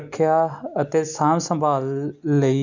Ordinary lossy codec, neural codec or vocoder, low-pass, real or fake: none; none; 7.2 kHz; real